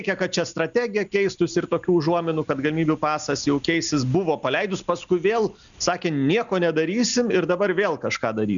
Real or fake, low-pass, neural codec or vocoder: real; 7.2 kHz; none